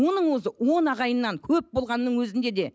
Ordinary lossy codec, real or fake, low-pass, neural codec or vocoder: none; real; none; none